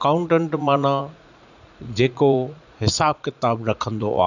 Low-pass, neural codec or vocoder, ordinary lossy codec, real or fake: 7.2 kHz; vocoder, 44.1 kHz, 80 mel bands, Vocos; none; fake